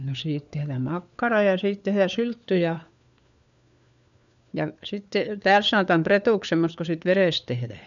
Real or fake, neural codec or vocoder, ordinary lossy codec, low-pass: fake; codec, 16 kHz, 4 kbps, FunCodec, trained on LibriTTS, 50 frames a second; none; 7.2 kHz